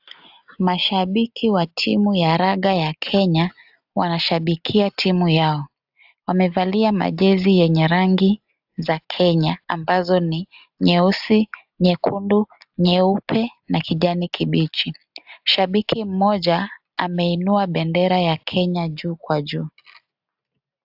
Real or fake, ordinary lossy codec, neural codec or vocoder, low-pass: real; AAC, 48 kbps; none; 5.4 kHz